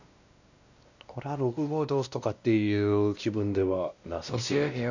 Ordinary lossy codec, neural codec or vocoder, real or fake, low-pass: none; codec, 16 kHz, 1 kbps, X-Codec, WavLM features, trained on Multilingual LibriSpeech; fake; 7.2 kHz